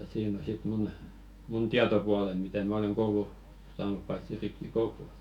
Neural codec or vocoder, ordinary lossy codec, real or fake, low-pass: autoencoder, 48 kHz, 128 numbers a frame, DAC-VAE, trained on Japanese speech; MP3, 96 kbps; fake; 19.8 kHz